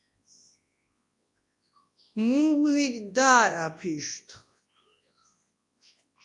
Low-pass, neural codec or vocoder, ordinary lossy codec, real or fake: 10.8 kHz; codec, 24 kHz, 0.9 kbps, WavTokenizer, large speech release; AAC, 48 kbps; fake